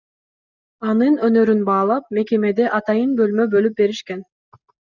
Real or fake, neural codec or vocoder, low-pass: real; none; 7.2 kHz